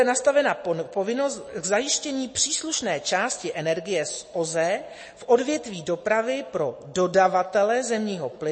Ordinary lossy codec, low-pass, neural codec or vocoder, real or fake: MP3, 32 kbps; 9.9 kHz; none; real